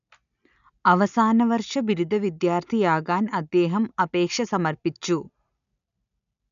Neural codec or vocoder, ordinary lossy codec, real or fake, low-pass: none; none; real; 7.2 kHz